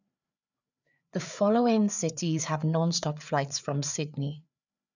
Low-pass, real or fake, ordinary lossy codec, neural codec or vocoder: 7.2 kHz; fake; none; codec, 16 kHz, 4 kbps, FreqCodec, larger model